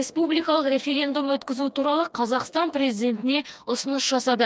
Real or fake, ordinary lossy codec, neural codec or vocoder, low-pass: fake; none; codec, 16 kHz, 2 kbps, FreqCodec, smaller model; none